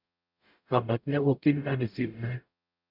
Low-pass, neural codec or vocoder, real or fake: 5.4 kHz; codec, 44.1 kHz, 0.9 kbps, DAC; fake